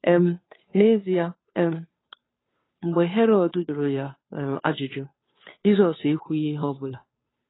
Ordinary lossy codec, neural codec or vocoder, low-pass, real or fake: AAC, 16 kbps; codec, 16 kHz, 2 kbps, FunCodec, trained on Chinese and English, 25 frames a second; 7.2 kHz; fake